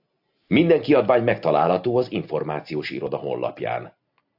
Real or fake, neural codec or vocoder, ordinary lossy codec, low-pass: real; none; AAC, 48 kbps; 5.4 kHz